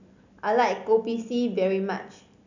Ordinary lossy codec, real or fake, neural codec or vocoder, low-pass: none; real; none; 7.2 kHz